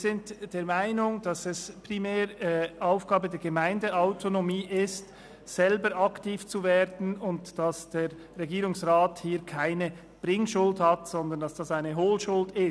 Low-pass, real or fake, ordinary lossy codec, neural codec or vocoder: none; real; none; none